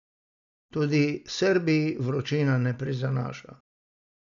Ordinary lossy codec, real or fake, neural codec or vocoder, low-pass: none; real; none; 7.2 kHz